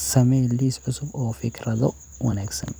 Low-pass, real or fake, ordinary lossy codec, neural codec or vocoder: none; fake; none; vocoder, 44.1 kHz, 128 mel bands every 256 samples, BigVGAN v2